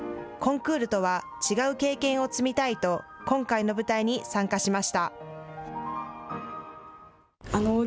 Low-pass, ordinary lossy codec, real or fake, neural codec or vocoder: none; none; real; none